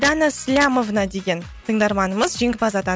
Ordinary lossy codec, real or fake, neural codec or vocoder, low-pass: none; real; none; none